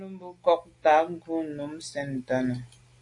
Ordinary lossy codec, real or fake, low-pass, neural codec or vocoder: AAC, 48 kbps; real; 10.8 kHz; none